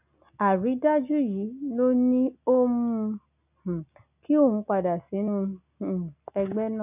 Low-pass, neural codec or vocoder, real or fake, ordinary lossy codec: 3.6 kHz; none; real; none